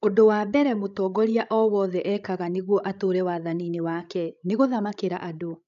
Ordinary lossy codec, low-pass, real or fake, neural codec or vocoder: none; 7.2 kHz; fake; codec, 16 kHz, 16 kbps, FreqCodec, larger model